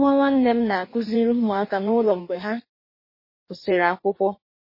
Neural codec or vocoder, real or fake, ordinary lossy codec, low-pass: codec, 16 kHz in and 24 kHz out, 1.1 kbps, FireRedTTS-2 codec; fake; MP3, 24 kbps; 5.4 kHz